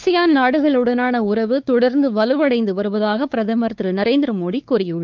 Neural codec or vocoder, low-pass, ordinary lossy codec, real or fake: codec, 16 kHz, 4 kbps, X-Codec, WavLM features, trained on Multilingual LibriSpeech; 7.2 kHz; Opus, 32 kbps; fake